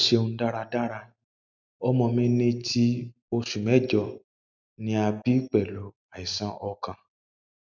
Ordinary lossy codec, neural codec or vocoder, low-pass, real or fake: none; none; 7.2 kHz; real